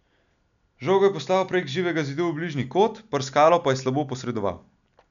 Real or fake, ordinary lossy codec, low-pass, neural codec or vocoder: real; none; 7.2 kHz; none